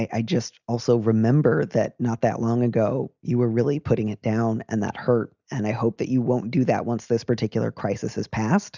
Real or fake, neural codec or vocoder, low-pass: real; none; 7.2 kHz